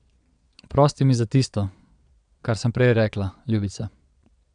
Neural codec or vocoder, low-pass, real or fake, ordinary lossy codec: vocoder, 22.05 kHz, 80 mel bands, Vocos; 9.9 kHz; fake; none